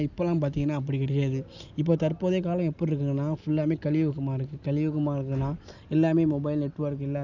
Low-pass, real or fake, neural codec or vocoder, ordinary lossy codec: 7.2 kHz; real; none; none